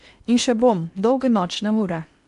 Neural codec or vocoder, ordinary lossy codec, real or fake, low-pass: codec, 16 kHz in and 24 kHz out, 0.8 kbps, FocalCodec, streaming, 65536 codes; AAC, 96 kbps; fake; 10.8 kHz